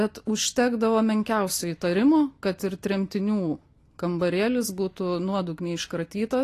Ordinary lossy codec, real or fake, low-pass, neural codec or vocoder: AAC, 48 kbps; real; 14.4 kHz; none